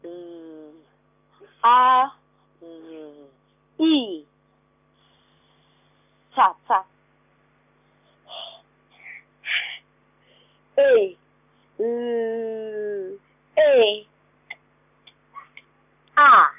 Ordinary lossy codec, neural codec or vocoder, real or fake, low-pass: none; none; real; 3.6 kHz